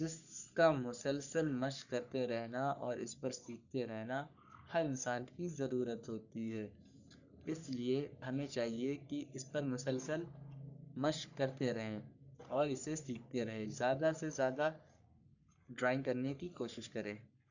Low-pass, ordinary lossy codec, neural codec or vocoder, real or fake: 7.2 kHz; none; codec, 44.1 kHz, 3.4 kbps, Pupu-Codec; fake